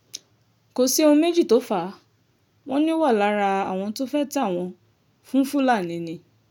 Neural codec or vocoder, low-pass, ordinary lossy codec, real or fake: none; 19.8 kHz; none; real